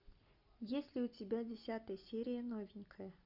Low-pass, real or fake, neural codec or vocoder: 5.4 kHz; real; none